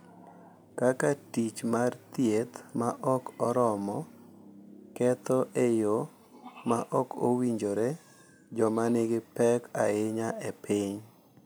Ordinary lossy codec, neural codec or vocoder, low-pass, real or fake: none; none; none; real